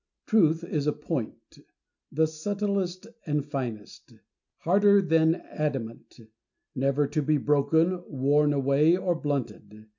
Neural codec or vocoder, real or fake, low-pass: none; real; 7.2 kHz